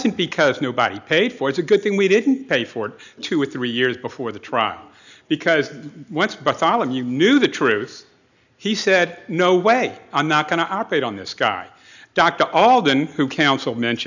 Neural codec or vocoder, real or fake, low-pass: none; real; 7.2 kHz